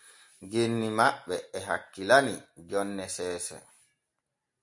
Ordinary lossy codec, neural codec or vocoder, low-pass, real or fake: MP3, 64 kbps; none; 10.8 kHz; real